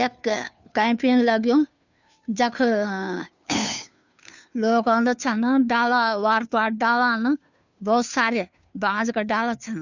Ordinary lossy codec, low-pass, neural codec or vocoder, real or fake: none; 7.2 kHz; codec, 16 kHz, 2 kbps, FunCodec, trained on Chinese and English, 25 frames a second; fake